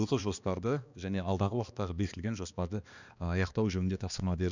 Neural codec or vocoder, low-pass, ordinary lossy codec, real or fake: codec, 16 kHz, 2 kbps, X-Codec, HuBERT features, trained on balanced general audio; 7.2 kHz; none; fake